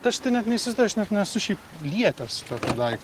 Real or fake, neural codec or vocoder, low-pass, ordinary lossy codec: real; none; 14.4 kHz; Opus, 16 kbps